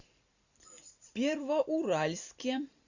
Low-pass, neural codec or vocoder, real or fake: 7.2 kHz; none; real